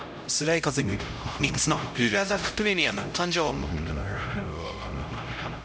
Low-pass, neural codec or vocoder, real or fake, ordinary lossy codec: none; codec, 16 kHz, 0.5 kbps, X-Codec, HuBERT features, trained on LibriSpeech; fake; none